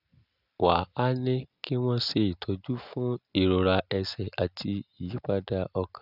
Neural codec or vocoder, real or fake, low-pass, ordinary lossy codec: none; real; 5.4 kHz; none